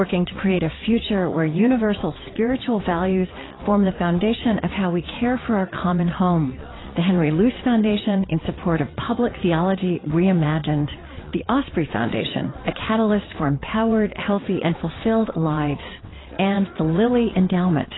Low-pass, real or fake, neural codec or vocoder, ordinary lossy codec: 7.2 kHz; fake; vocoder, 22.05 kHz, 80 mel bands, WaveNeXt; AAC, 16 kbps